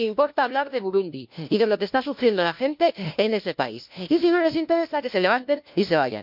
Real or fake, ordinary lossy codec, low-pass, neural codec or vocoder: fake; MP3, 48 kbps; 5.4 kHz; codec, 16 kHz, 1 kbps, FunCodec, trained on LibriTTS, 50 frames a second